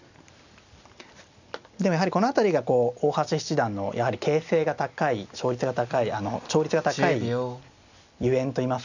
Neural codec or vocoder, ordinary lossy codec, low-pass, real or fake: none; none; 7.2 kHz; real